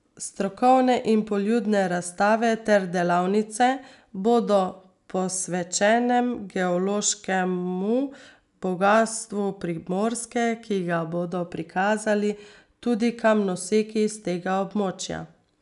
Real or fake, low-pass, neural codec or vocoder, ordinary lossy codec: real; 10.8 kHz; none; none